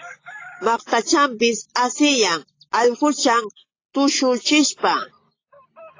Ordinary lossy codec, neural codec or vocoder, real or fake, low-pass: AAC, 32 kbps; none; real; 7.2 kHz